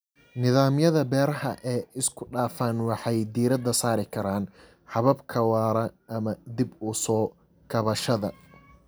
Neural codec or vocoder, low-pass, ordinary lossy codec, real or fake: none; none; none; real